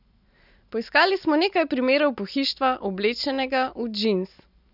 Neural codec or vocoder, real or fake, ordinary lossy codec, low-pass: none; real; none; 5.4 kHz